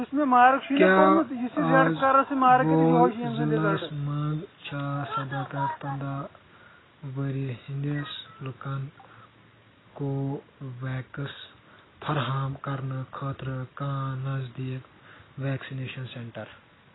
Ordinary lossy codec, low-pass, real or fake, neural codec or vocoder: AAC, 16 kbps; 7.2 kHz; real; none